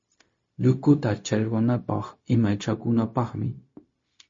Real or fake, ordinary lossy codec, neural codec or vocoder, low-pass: fake; MP3, 32 kbps; codec, 16 kHz, 0.4 kbps, LongCat-Audio-Codec; 7.2 kHz